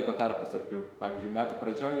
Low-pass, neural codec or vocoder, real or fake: 19.8 kHz; autoencoder, 48 kHz, 32 numbers a frame, DAC-VAE, trained on Japanese speech; fake